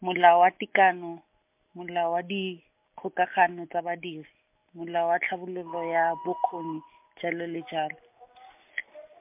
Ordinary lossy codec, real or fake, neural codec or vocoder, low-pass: MP3, 32 kbps; real; none; 3.6 kHz